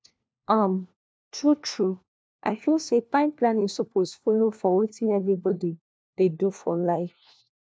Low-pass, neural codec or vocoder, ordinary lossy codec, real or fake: none; codec, 16 kHz, 1 kbps, FunCodec, trained on LibriTTS, 50 frames a second; none; fake